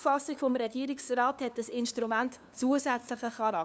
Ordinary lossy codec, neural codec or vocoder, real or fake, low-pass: none; codec, 16 kHz, 2 kbps, FunCodec, trained on LibriTTS, 25 frames a second; fake; none